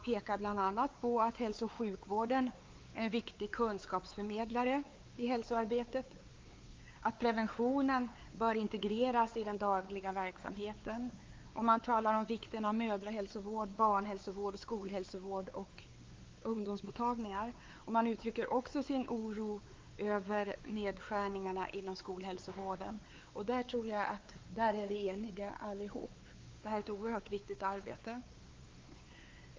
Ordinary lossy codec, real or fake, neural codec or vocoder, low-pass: Opus, 32 kbps; fake; codec, 16 kHz, 4 kbps, X-Codec, WavLM features, trained on Multilingual LibriSpeech; 7.2 kHz